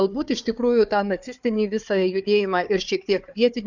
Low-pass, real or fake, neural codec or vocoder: 7.2 kHz; fake; codec, 16 kHz, 4 kbps, FunCodec, trained on Chinese and English, 50 frames a second